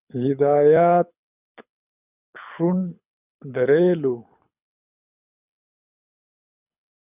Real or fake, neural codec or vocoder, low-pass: fake; codec, 24 kHz, 6 kbps, HILCodec; 3.6 kHz